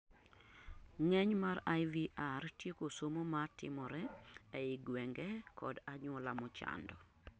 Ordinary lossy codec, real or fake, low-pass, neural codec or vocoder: none; real; none; none